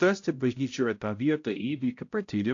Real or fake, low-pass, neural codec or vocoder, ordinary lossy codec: fake; 7.2 kHz; codec, 16 kHz, 0.5 kbps, X-Codec, HuBERT features, trained on balanced general audio; AAC, 48 kbps